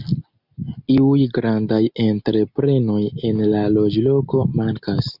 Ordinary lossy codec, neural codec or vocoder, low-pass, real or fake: Opus, 64 kbps; none; 5.4 kHz; real